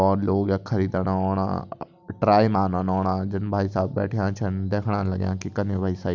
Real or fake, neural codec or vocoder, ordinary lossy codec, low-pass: real; none; none; 7.2 kHz